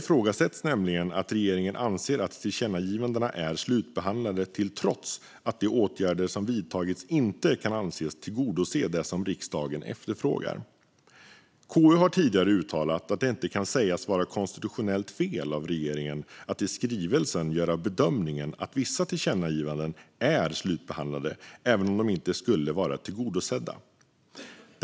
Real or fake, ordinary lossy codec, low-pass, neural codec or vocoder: real; none; none; none